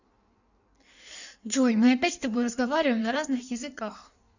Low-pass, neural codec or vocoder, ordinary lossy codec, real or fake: 7.2 kHz; codec, 16 kHz in and 24 kHz out, 1.1 kbps, FireRedTTS-2 codec; none; fake